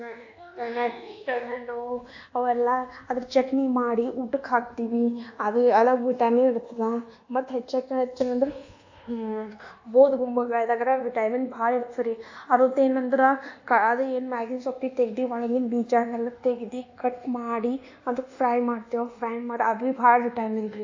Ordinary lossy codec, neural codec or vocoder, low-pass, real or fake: AAC, 48 kbps; codec, 24 kHz, 1.2 kbps, DualCodec; 7.2 kHz; fake